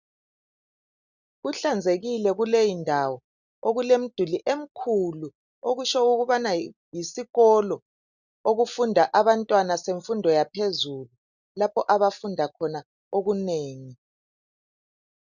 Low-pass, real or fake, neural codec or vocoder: 7.2 kHz; real; none